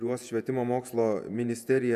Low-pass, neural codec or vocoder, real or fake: 14.4 kHz; vocoder, 48 kHz, 128 mel bands, Vocos; fake